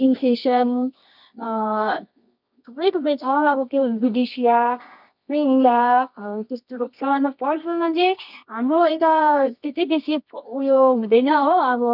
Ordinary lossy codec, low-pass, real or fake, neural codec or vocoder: none; 5.4 kHz; fake; codec, 24 kHz, 0.9 kbps, WavTokenizer, medium music audio release